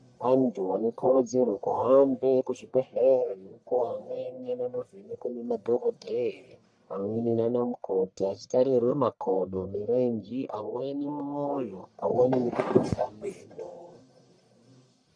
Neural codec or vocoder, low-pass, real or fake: codec, 44.1 kHz, 1.7 kbps, Pupu-Codec; 9.9 kHz; fake